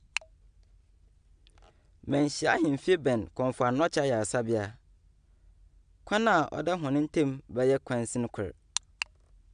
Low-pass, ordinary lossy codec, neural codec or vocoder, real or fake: 9.9 kHz; AAC, 96 kbps; none; real